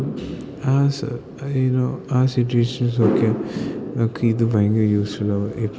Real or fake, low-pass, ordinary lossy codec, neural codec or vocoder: real; none; none; none